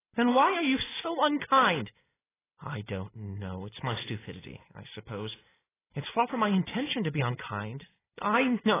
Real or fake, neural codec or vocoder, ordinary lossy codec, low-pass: real; none; AAC, 16 kbps; 3.6 kHz